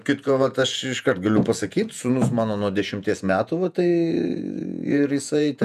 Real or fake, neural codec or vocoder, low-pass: fake; vocoder, 48 kHz, 128 mel bands, Vocos; 14.4 kHz